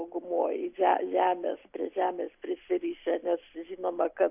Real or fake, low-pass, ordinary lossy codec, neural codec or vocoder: real; 3.6 kHz; AAC, 32 kbps; none